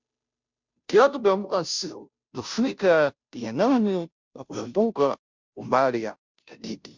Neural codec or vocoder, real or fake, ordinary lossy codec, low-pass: codec, 16 kHz, 0.5 kbps, FunCodec, trained on Chinese and English, 25 frames a second; fake; MP3, 64 kbps; 7.2 kHz